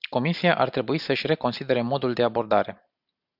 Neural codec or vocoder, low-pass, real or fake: none; 5.4 kHz; real